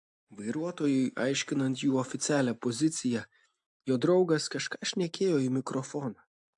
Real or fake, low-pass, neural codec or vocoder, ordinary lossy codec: real; 10.8 kHz; none; AAC, 64 kbps